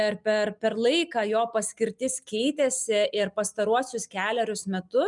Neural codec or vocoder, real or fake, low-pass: none; real; 10.8 kHz